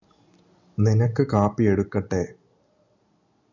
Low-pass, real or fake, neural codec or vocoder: 7.2 kHz; real; none